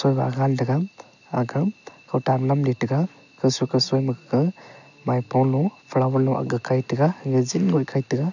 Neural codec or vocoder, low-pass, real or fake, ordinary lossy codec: none; 7.2 kHz; real; none